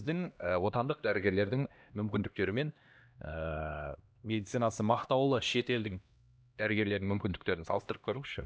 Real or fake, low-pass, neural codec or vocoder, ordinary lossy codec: fake; none; codec, 16 kHz, 1 kbps, X-Codec, HuBERT features, trained on LibriSpeech; none